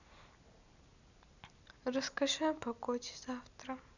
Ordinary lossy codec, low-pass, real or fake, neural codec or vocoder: none; 7.2 kHz; real; none